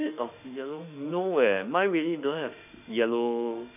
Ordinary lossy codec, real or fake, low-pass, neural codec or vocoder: none; fake; 3.6 kHz; autoencoder, 48 kHz, 32 numbers a frame, DAC-VAE, trained on Japanese speech